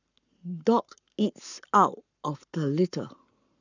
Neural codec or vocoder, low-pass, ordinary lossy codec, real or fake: codec, 44.1 kHz, 7.8 kbps, Pupu-Codec; 7.2 kHz; none; fake